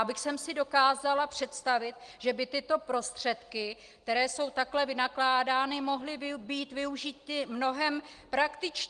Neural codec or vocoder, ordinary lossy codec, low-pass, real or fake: none; Opus, 24 kbps; 9.9 kHz; real